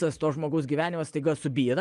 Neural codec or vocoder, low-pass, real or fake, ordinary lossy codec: none; 10.8 kHz; real; Opus, 32 kbps